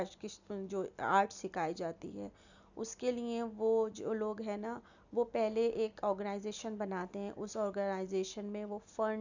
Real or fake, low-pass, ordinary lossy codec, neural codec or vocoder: real; 7.2 kHz; none; none